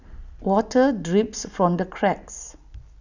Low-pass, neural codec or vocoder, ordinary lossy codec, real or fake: 7.2 kHz; none; none; real